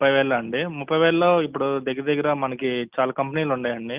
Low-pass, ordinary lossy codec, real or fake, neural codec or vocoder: 3.6 kHz; Opus, 32 kbps; real; none